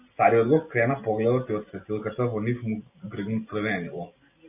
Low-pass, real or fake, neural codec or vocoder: 3.6 kHz; real; none